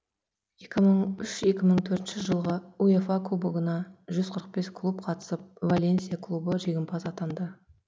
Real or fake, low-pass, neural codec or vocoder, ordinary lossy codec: real; none; none; none